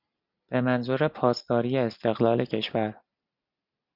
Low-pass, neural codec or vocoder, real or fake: 5.4 kHz; none; real